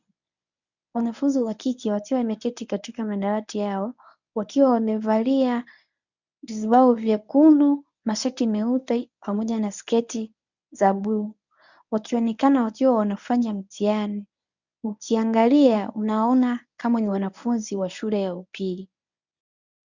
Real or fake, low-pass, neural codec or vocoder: fake; 7.2 kHz; codec, 24 kHz, 0.9 kbps, WavTokenizer, medium speech release version 1